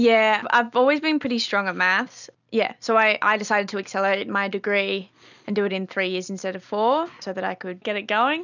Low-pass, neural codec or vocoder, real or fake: 7.2 kHz; none; real